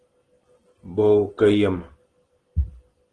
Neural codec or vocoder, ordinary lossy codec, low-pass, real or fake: none; Opus, 16 kbps; 9.9 kHz; real